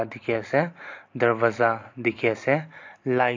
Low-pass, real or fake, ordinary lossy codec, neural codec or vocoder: 7.2 kHz; real; AAC, 48 kbps; none